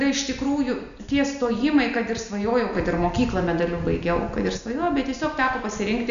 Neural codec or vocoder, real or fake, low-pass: none; real; 7.2 kHz